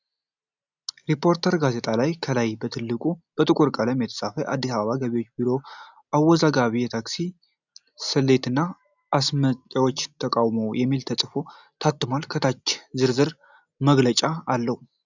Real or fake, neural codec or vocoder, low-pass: real; none; 7.2 kHz